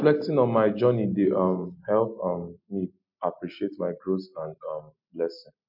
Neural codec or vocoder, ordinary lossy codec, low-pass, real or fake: none; MP3, 48 kbps; 5.4 kHz; real